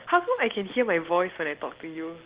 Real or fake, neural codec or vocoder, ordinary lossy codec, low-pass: real; none; Opus, 16 kbps; 3.6 kHz